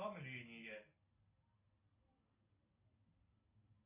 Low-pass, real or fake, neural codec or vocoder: 3.6 kHz; real; none